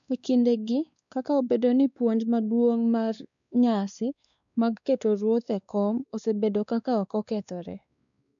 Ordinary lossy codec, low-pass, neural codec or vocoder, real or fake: none; 7.2 kHz; codec, 16 kHz, 2 kbps, X-Codec, WavLM features, trained on Multilingual LibriSpeech; fake